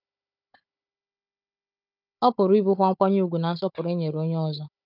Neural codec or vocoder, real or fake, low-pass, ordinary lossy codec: codec, 16 kHz, 16 kbps, FunCodec, trained on Chinese and English, 50 frames a second; fake; 5.4 kHz; none